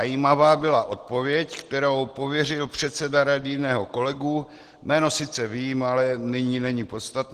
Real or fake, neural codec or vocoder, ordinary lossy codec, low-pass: real; none; Opus, 16 kbps; 14.4 kHz